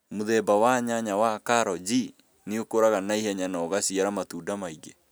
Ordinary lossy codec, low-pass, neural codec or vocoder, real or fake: none; none; none; real